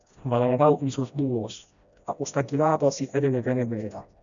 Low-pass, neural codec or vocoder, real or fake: 7.2 kHz; codec, 16 kHz, 1 kbps, FreqCodec, smaller model; fake